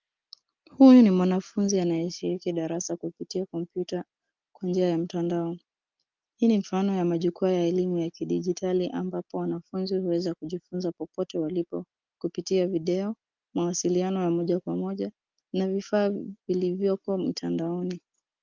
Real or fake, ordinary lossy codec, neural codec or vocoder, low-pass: real; Opus, 32 kbps; none; 7.2 kHz